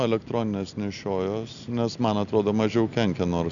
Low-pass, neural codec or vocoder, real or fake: 7.2 kHz; none; real